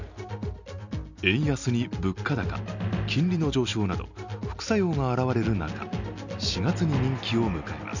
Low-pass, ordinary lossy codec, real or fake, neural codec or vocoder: 7.2 kHz; none; real; none